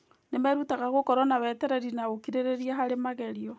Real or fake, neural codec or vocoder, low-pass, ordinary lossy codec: real; none; none; none